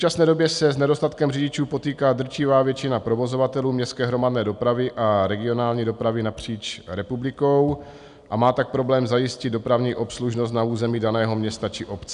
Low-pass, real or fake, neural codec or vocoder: 10.8 kHz; real; none